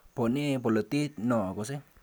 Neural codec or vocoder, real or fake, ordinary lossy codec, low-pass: none; real; none; none